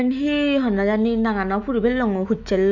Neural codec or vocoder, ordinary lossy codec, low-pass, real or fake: autoencoder, 48 kHz, 128 numbers a frame, DAC-VAE, trained on Japanese speech; none; 7.2 kHz; fake